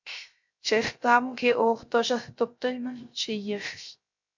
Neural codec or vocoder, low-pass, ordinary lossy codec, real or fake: codec, 16 kHz, 0.3 kbps, FocalCodec; 7.2 kHz; MP3, 48 kbps; fake